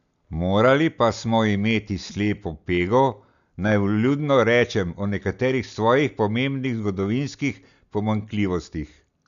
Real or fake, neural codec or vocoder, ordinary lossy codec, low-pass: real; none; none; 7.2 kHz